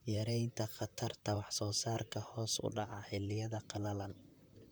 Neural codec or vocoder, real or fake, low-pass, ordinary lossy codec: vocoder, 44.1 kHz, 128 mel bands, Pupu-Vocoder; fake; none; none